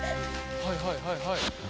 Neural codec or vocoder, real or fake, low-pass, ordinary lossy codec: none; real; none; none